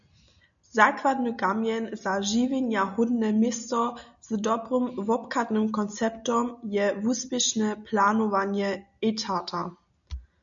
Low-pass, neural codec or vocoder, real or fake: 7.2 kHz; none; real